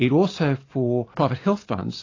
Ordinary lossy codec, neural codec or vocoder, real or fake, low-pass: AAC, 32 kbps; none; real; 7.2 kHz